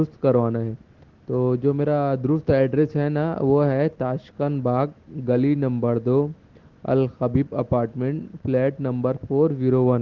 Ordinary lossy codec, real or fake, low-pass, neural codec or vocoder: Opus, 16 kbps; real; 7.2 kHz; none